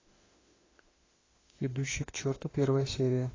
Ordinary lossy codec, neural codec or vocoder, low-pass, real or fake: AAC, 32 kbps; autoencoder, 48 kHz, 32 numbers a frame, DAC-VAE, trained on Japanese speech; 7.2 kHz; fake